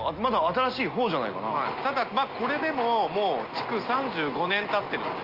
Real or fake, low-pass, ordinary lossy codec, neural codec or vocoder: real; 5.4 kHz; Opus, 32 kbps; none